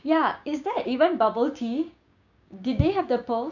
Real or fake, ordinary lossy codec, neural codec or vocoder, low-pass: fake; none; codec, 16 kHz, 6 kbps, DAC; 7.2 kHz